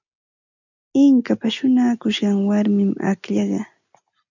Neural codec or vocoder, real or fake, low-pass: none; real; 7.2 kHz